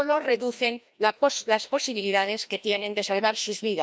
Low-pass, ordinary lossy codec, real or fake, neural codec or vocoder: none; none; fake; codec, 16 kHz, 1 kbps, FreqCodec, larger model